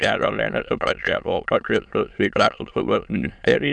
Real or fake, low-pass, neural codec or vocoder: fake; 9.9 kHz; autoencoder, 22.05 kHz, a latent of 192 numbers a frame, VITS, trained on many speakers